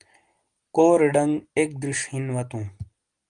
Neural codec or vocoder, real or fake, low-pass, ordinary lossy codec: none; real; 9.9 kHz; Opus, 24 kbps